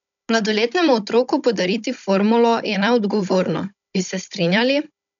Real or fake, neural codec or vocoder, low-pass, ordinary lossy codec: fake; codec, 16 kHz, 16 kbps, FunCodec, trained on Chinese and English, 50 frames a second; 7.2 kHz; none